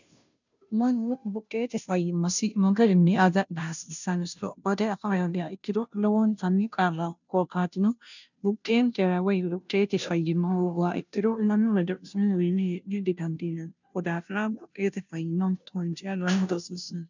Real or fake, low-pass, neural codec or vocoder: fake; 7.2 kHz; codec, 16 kHz, 0.5 kbps, FunCodec, trained on Chinese and English, 25 frames a second